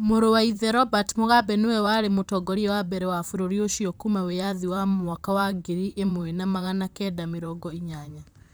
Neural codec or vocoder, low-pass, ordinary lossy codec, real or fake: vocoder, 44.1 kHz, 128 mel bands, Pupu-Vocoder; none; none; fake